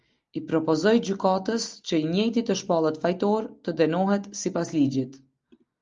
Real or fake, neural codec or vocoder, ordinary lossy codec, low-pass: real; none; Opus, 32 kbps; 7.2 kHz